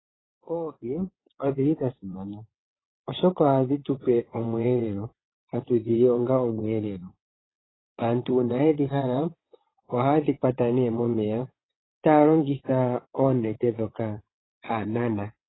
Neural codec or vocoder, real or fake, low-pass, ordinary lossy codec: vocoder, 22.05 kHz, 80 mel bands, WaveNeXt; fake; 7.2 kHz; AAC, 16 kbps